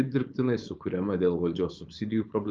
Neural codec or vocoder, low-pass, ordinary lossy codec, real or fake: codec, 16 kHz, 4.8 kbps, FACodec; 7.2 kHz; Opus, 32 kbps; fake